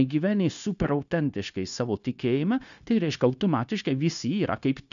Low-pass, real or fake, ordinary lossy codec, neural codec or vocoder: 7.2 kHz; fake; MP3, 96 kbps; codec, 16 kHz, 0.9 kbps, LongCat-Audio-Codec